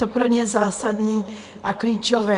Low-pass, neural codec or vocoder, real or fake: 10.8 kHz; codec, 24 kHz, 0.9 kbps, WavTokenizer, small release; fake